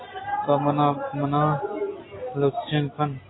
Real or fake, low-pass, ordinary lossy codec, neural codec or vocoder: real; 7.2 kHz; AAC, 16 kbps; none